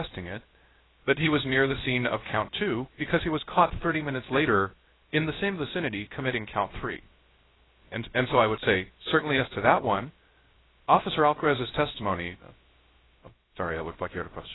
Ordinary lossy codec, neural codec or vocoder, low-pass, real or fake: AAC, 16 kbps; codec, 16 kHz, 0.3 kbps, FocalCodec; 7.2 kHz; fake